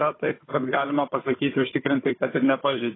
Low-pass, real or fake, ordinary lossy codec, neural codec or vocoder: 7.2 kHz; fake; AAC, 16 kbps; codec, 16 kHz, 4 kbps, FunCodec, trained on Chinese and English, 50 frames a second